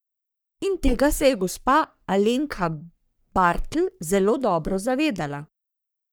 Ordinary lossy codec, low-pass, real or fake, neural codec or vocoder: none; none; fake; codec, 44.1 kHz, 3.4 kbps, Pupu-Codec